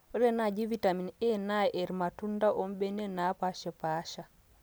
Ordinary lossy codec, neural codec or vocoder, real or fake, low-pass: none; none; real; none